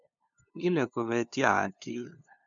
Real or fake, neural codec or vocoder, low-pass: fake; codec, 16 kHz, 2 kbps, FunCodec, trained on LibriTTS, 25 frames a second; 7.2 kHz